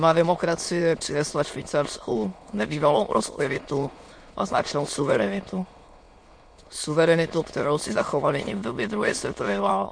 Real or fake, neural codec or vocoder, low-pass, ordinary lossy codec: fake; autoencoder, 22.05 kHz, a latent of 192 numbers a frame, VITS, trained on many speakers; 9.9 kHz; MP3, 48 kbps